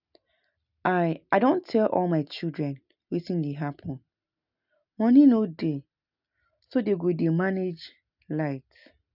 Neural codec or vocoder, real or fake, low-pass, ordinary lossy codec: none; real; 5.4 kHz; none